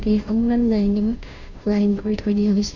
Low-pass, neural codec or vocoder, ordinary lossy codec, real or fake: 7.2 kHz; codec, 16 kHz, 0.5 kbps, FunCodec, trained on Chinese and English, 25 frames a second; none; fake